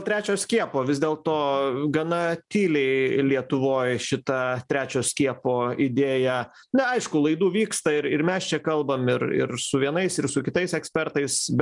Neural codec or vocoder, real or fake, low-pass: none; real; 10.8 kHz